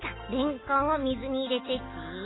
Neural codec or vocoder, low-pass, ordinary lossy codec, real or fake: none; 7.2 kHz; AAC, 16 kbps; real